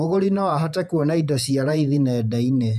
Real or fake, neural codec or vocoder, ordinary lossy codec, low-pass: fake; vocoder, 48 kHz, 128 mel bands, Vocos; none; 14.4 kHz